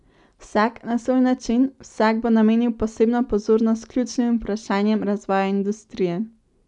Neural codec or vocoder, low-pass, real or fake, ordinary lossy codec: none; 10.8 kHz; real; none